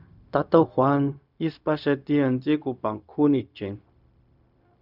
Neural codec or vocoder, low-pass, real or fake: codec, 16 kHz, 0.4 kbps, LongCat-Audio-Codec; 5.4 kHz; fake